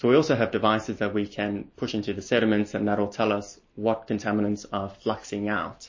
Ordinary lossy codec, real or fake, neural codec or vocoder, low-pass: MP3, 32 kbps; real; none; 7.2 kHz